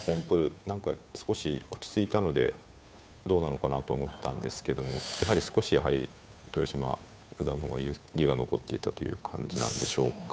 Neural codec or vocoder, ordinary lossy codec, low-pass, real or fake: codec, 16 kHz, 2 kbps, FunCodec, trained on Chinese and English, 25 frames a second; none; none; fake